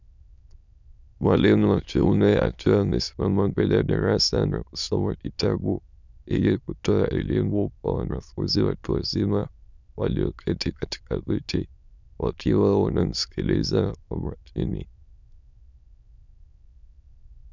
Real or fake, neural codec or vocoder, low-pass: fake; autoencoder, 22.05 kHz, a latent of 192 numbers a frame, VITS, trained on many speakers; 7.2 kHz